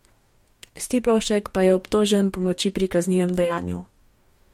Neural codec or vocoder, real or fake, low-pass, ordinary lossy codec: codec, 44.1 kHz, 2.6 kbps, DAC; fake; 19.8 kHz; MP3, 64 kbps